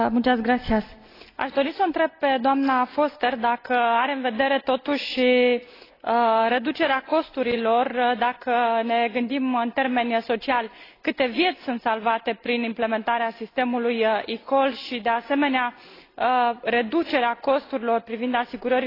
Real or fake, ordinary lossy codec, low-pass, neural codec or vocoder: real; AAC, 24 kbps; 5.4 kHz; none